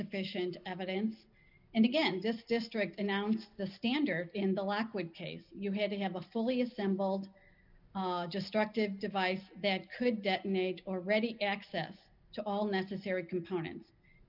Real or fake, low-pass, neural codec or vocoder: real; 5.4 kHz; none